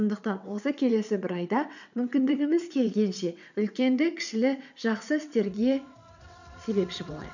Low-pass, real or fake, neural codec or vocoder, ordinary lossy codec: 7.2 kHz; fake; vocoder, 22.05 kHz, 80 mel bands, WaveNeXt; none